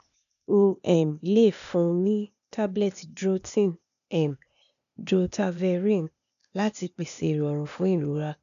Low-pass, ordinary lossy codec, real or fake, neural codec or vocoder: 7.2 kHz; none; fake; codec, 16 kHz, 0.8 kbps, ZipCodec